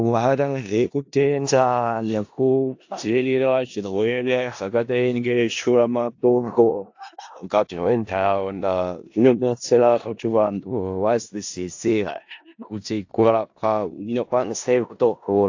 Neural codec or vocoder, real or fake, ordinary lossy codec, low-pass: codec, 16 kHz in and 24 kHz out, 0.4 kbps, LongCat-Audio-Codec, four codebook decoder; fake; AAC, 48 kbps; 7.2 kHz